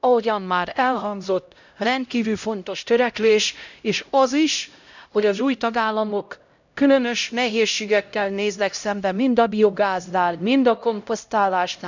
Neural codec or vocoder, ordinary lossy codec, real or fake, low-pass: codec, 16 kHz, 0.5 kbps, X-Codec, HuBERT features, trained on LibriSpeech; none; fake; 7.2 kHz